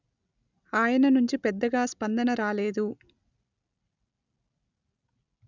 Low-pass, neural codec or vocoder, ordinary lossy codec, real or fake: 7.2 kHz; none; none; real